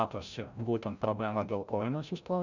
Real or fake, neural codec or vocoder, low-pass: fake; codec, 16 kHz, 0.5 kbps, FreqCodec, larger model; 7.2 kHz